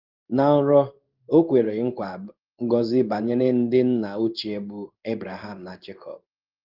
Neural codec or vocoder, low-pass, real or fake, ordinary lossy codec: codec, 16 kHz in and 24 kHz out, 1 kbps, XY-Tokenizer; 5.4 kHz; fake; Opus, 32 kbps